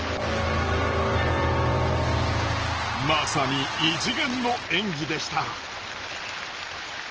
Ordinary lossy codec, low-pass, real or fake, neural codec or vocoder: Opus, 16 kbps; 7.2 kHz; real; none